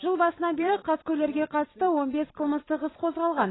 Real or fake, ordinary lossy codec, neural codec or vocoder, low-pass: fake; AAC, 16 kbps; vocoder, 44.1 kHz, 128 mel bands every 256 samples, BigVGAN v2; 7.2 kHz